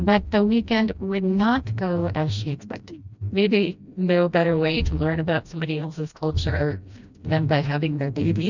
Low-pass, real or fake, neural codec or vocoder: 7.2 kHz; fake; codec, 16 kHz, 1 kbps, FreqCodec, smaller model